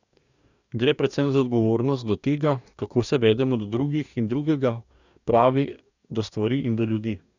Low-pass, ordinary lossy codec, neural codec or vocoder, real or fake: 7.2 kHz; none; codec, 44.1 kHz, 2.6 kbps, DAC; fake